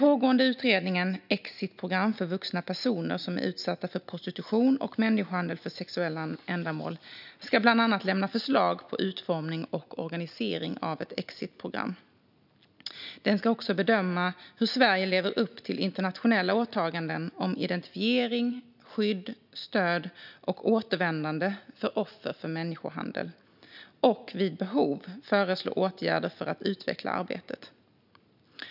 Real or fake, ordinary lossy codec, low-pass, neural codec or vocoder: real; none; 5.4 kHz; none